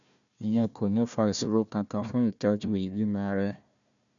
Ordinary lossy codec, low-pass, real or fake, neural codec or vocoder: none; 7.2 kHz; fake; codec, 16 kHz, 1 kbps, FunCodec, trained on Chinese and English, 50 frames a second